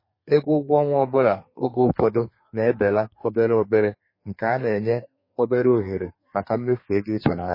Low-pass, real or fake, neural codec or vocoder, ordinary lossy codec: 5.4 kHz; fake; codec, 32 kHz, 1.9 kbps, SNAC; MP3, 24 kbps